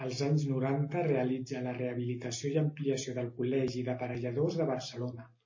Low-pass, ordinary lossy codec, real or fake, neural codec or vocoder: 7.2 kHz; MP3, 32 kbps; real; none